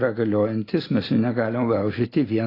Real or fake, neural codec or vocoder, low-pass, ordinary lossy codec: real; none; 5.4 kHz; AAC, 24 kbps